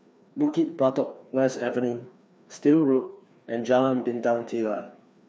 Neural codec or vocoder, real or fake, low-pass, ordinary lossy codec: codec, 16 kHz, 2 kbps, FreqCodec, larger model; fake; none; none